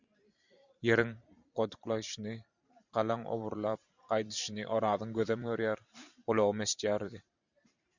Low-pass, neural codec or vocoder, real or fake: 7.2 kHz; vocoder, 44.1 kHz, 128 mel bands every 512 samples, BigVGAN v2; fake